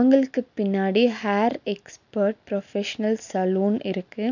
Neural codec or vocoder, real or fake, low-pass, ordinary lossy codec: none; real; 7.2 kHz; none